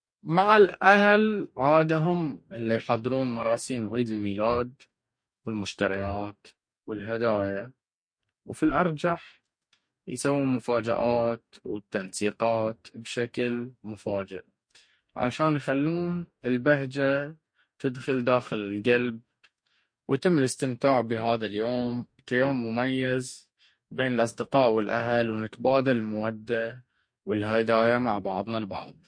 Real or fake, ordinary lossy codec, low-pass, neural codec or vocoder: fake; MP3, 48 kbps; 9.9 kHz; codec, 44.1 kHz, 2.6 kbps, DAC